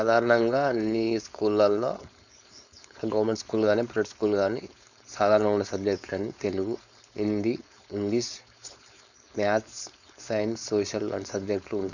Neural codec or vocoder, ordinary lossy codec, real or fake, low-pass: codec, 16 kHz, 4.8 kbps, FACodec; none; fake; 7.2 kHz